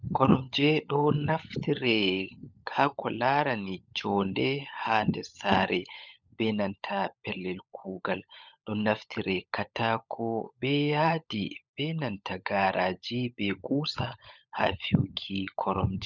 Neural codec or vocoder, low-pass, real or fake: codec, 16 kHz, 16 kbps, FunCodec, trained on LibriTTS, 50 frames a second; 7.2 kHz; fake